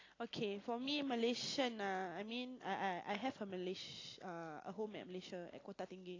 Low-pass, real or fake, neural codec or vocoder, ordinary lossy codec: 7.2 kHz; real; none; AAC, 32 kbps